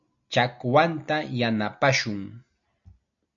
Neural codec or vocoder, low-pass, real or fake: none; 7.2 kHz; real